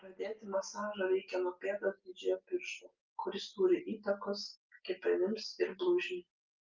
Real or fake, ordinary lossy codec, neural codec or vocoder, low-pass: real; Opus, 24 kbps; none; 7.2 kHz